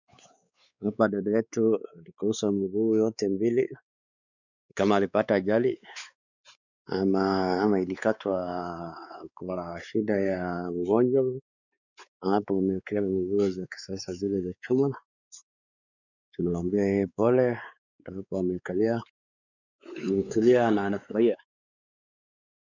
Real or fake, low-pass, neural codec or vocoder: fake; 7.2 kHz; codec, 16 kHz, 4 kbps, X-Codec, WavLM features, trained on Multilingual LibriSpeech